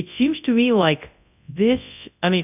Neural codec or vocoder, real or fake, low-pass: codec, 24 kHz, 0.9 kbps, WavTokenizer, large speech release; fake; 3.6 kHz